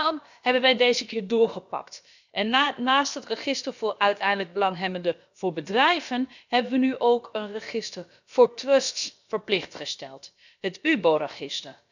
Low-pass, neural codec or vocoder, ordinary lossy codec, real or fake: 7.2 kHz; codec, 16 kHz, about 1 kbps, DyCAST, with the encoder's durations; none; fake